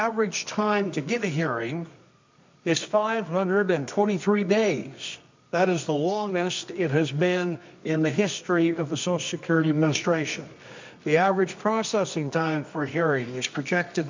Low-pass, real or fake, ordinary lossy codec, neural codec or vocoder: 7.2 kHz; fake; MP3, 64 kbps; codec, 24 kHz, 0.9 kbps, WavTokenizer, medium music audio release